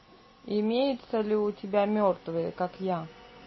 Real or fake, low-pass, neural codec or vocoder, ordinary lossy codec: real; 7.2 kHz; none; MP3, 24 kbps